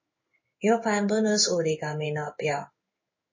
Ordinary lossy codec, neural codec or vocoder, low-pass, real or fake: MP3, 32 kbps; codec, 16 kHz in and 24 kHz out, 1 kbps, XY-Tokenizer; 7.2 kHz; fake